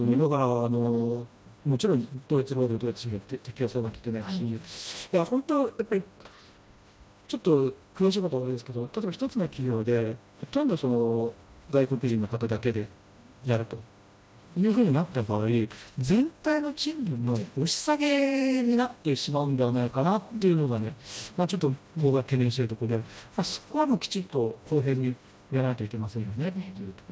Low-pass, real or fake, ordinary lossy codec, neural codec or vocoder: none; fake; none; codec, 16 kHz, 1 kbps, FreqCodec, smaller model